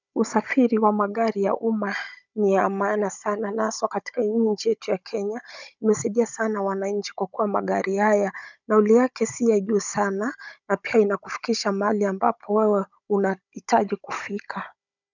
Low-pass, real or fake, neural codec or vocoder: 7.2 kHz; fake; codec, 16 kHz, 16 kbps, FunCodec, trained on Chinese and English, 50 frames a second